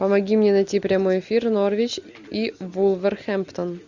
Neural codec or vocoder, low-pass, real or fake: none; 7.2 kHz; real